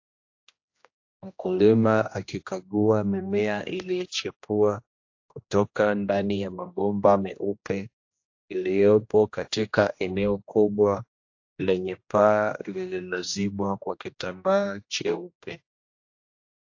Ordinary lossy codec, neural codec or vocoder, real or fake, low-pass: AAC, 48 kbps; codec, 16 kHz, 1 kbps, X-Codec, HuBERT features, trained on general audio; fake; 7.2 kHz